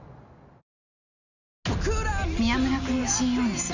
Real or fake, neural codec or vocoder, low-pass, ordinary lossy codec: real; none; 7.2 kHz; none